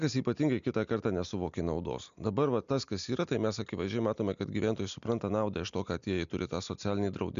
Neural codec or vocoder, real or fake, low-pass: none; real; 7.2 kHz